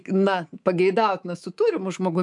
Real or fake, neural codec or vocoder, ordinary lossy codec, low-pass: fake; codec, 24 kHz, 3.1 kbps, DualCodec; MP3, 64 kbps; 10.8 kHz